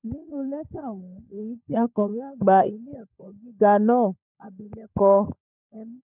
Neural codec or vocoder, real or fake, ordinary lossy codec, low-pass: codec, 16 kHz, 16 kbps, FunCodec, trained on LibriTTS, 50 frames a second; fake; none; 3.6 kHz